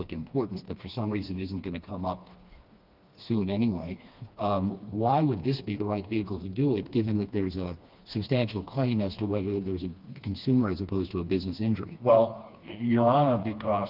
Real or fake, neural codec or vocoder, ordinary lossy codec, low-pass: fake; codec, 16 kHz, 2 kbps, FreqCodec, smaller model; Opus, 32 kbps; 5.4 kHz